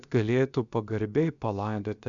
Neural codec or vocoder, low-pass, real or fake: codec, 16 kHz, 0.7 kbps, FocalCodec; 7.2 kHz; fake